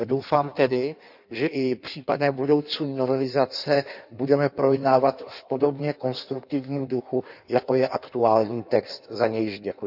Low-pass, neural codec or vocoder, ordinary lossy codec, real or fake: 5.4 kHz; codec, 16 kHz in and 24 kHz out, 1.1 kbps, FireRedTTS-2 codec; none; fake